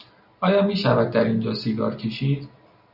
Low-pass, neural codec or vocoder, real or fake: 5.4 kHz; none; real